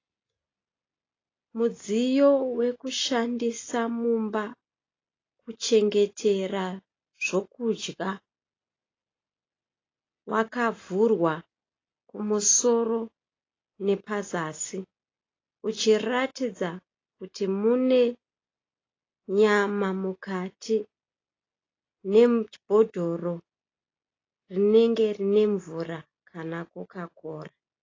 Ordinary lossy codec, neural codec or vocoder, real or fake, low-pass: AAC, 32 kbps; none; real; 7.2 kHz